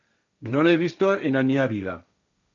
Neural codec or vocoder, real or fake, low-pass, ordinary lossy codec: codec, 16 kHz, 1.1 kbps, Voila-Tokenizer; fake; 7.2 kHz; MP3, 96 kbps